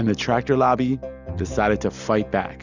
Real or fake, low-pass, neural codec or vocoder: real; 7.2 kHz; none